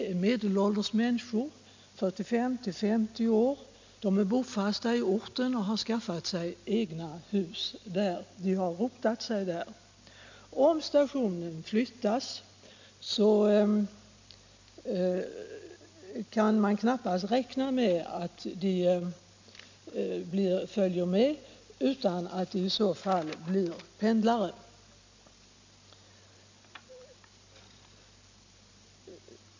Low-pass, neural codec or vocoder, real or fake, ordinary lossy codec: 7.2 kHz; none; real; MP3, 64 kbps